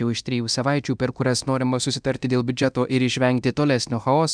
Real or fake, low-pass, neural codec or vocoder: fake; 9.9 kHz; codec, 24 kHz, 0.9 kbps, DualCodec